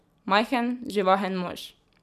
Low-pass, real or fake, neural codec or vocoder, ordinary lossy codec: 14.4 kHz; fake; vocoder, 44.1 kHz, 128 mel bands every 256 samples, BigVGAN v2; none